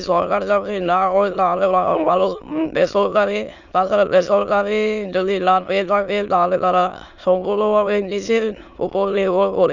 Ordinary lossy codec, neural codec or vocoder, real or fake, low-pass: none; autoencoder, 22.05 kHz, a latent of 192 numbers a frame, VITS, trained on many speakers; fake; 7.2 kHz